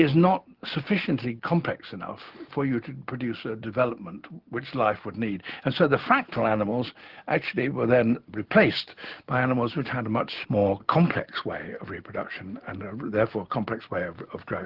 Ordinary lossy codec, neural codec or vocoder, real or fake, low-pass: Opus, 16 kbps; none; real; 5.4 kHz